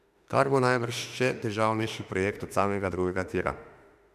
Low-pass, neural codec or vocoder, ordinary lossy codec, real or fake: 14.4 kHz; autoencoder, 48 kHz, 32 numbers a frame, DAC-VAE, trained on Japanese speech; none; fake